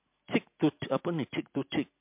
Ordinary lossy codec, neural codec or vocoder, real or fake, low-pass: MP3, 32 kbps; none; real; 3.6 kHz